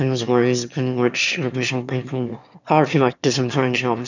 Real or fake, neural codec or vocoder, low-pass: fake; autoencoder, 22.05 kHz, a latent of 192 numbers a frame, VITS, trained on one speaker; 7.2 kHz